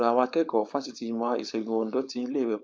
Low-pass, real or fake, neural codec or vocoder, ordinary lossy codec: none; fake; codec, 16 kHz, 4.8 kbps, FACodec; none